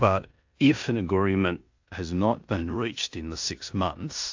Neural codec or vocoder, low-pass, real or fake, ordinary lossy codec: codec, 16 kHz in and 24 kHz out, 0.9 kbps, LongCat-Audio-Codec, four codebook decoder; 7.2 kHz; fake; AAC, 48 kbps